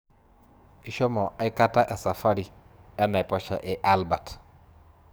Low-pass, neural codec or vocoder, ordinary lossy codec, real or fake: none; codec, 44.1 kHz, 7.8 kbps, DAC; none; fake